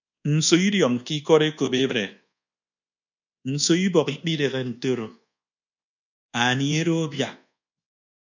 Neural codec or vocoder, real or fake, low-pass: codec, 24 kHz, 1.2 kbps, DualCodec; fake; 7.2 kHz